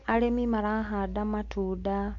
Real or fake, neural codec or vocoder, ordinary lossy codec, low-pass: real; none; AAC, 48 kbps; 7.2 kHz